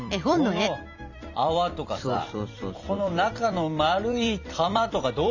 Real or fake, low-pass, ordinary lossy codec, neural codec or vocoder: fake; 7.2 kHz; none; vocoder, 44.1 kHz, 128 mel bands every 256 samples, BigVGAN v2